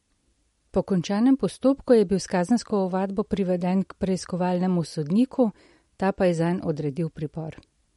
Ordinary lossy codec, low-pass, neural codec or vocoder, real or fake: MP3, 48 kbps; 19.8 kHz; none; real